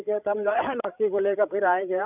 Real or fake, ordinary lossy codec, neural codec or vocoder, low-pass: fake; none; codec, 16 kHz, 16 kbps, FreqCodec, larger model; 3.6 kHz